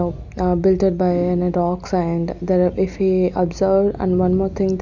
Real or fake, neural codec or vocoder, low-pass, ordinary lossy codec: real; none; 7.2 kHz; none